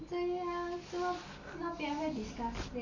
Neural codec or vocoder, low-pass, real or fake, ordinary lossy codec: none; 7.2 kHz; real; none